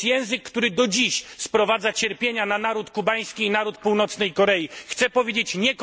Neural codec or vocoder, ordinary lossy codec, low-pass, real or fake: none; none; none; real